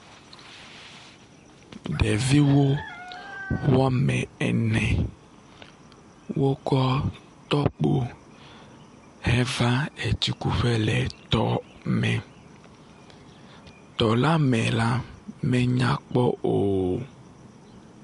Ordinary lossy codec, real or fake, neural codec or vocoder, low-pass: MP3, 48 kbps; real; none; 14.4 kHz